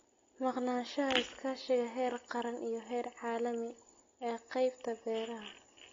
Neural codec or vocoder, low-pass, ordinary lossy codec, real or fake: none; 7.2 kHz; AAC, 32 kbps; real